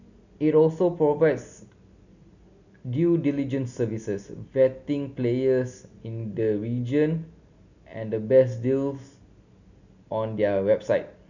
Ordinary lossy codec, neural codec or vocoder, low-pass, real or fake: none; none; 7.2 kHz; real